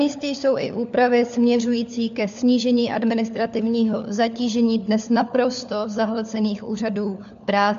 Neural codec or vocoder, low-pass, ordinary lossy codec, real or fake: codec, 16 kHz, 4 kbps, FunCodec, trained on LibriTTS, 50 frames a second; 7.2 kHz; MP3, 64 kbps; fake